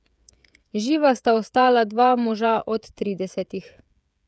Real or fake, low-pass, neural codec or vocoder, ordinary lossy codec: fake; none; codec, 16 kHz, 16 kbps, FreqCodec, smaller model; none